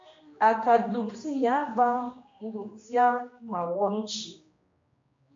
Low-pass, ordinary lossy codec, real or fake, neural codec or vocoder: 7.2 kHz; MP3, 48 kbps; fake; codec, 16 kHz, 1 kbps, X-Codec, HuBERT features, trained on general audio